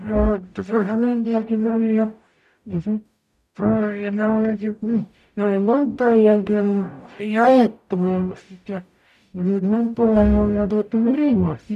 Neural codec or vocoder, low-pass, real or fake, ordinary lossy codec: codec, 44.1 kHz, 0.9 kbps, DAC; 14.4 kHz; fake; none